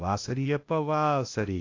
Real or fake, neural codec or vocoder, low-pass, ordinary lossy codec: fake; codec, 16 kHz, 0.8 kbps, ZipCodec; 7.2 kHz; AAC, 48 kbps